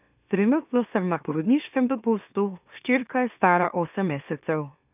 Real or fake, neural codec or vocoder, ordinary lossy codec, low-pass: fake; autoencoder, 44.1 kHz, a latent of 192 numbers a frame, MeloTTS; none; 3.6 kHz